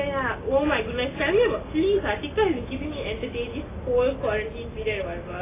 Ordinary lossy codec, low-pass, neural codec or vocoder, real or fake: AAC, 16 kbps; 3.6 kHz; none; real